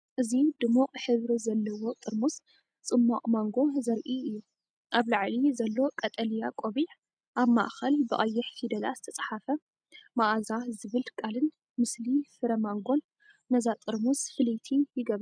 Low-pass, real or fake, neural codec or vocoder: 9.9 kHz; real; none